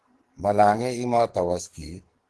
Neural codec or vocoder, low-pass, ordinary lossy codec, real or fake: codec, 44.1 kHz, 2.6 kbps, SNAC; 10.8 kHz; Opus, 16 kbps; fake